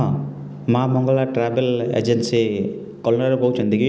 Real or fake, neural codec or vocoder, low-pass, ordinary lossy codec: real; none; none; none